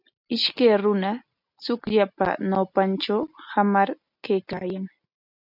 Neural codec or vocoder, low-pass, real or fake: none; 5.4 kHz; real